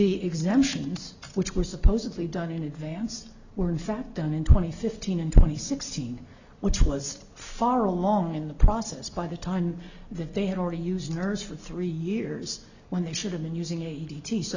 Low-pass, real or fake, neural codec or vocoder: 7.2 kHz; real; none